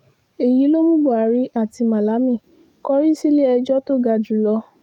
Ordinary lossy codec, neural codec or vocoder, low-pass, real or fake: none; codec, 44.1 kHz, 7.8 kbps, DAC; 19.8 kHz; fake